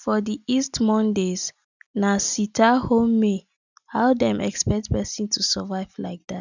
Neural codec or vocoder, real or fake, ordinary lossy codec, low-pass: none; real; none; 7.2 kHz